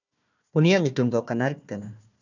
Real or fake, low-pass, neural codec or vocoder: fake; 7.2 kHz; codec, 16 kHz, 1 kbps, FunCodec, trained on Chinese and English, 50 frames a second